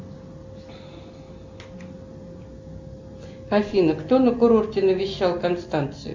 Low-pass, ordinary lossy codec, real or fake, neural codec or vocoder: 7.2 kHz; MP3, 64 kbps; real; none